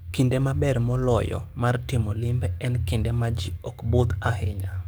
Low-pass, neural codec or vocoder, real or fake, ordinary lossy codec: none; codec, 44.1 kHz, 7.8 kbps, DAC; fake; none